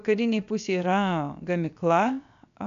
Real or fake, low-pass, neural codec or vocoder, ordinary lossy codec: fake; 7.2 kHz; codec, 16 kHz, 0.7 kbps, FocalCodec; AAC, 96 kbps